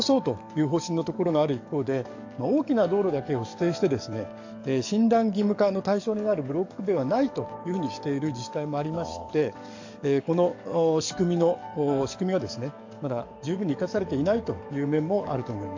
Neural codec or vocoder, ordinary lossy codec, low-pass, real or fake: codec, 44.1 kHz, 7.8 kbps, DAC; AAC, 48 kbps; 7.2 kHz; fake